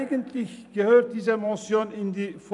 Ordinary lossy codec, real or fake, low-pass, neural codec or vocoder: none; fake; 10.8 kHz; vocoder, 44.1 kHz, 128 mel bands every 256 samples, BigVGAN v2